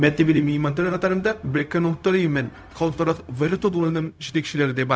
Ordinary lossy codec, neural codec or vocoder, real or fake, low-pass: none; codec, 16 kHz, 0.4 kbps, LongCat-Audio-Codec; fake; none